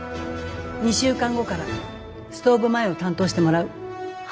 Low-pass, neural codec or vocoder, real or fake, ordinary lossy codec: none; none; real; none